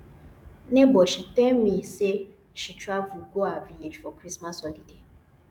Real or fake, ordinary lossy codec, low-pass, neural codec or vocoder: fake; none; 19.8 kHz; codec, 44.1 kHz, 7.8 kbps, DAC